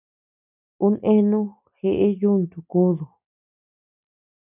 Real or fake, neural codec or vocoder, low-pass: real; none; 3.6 kHz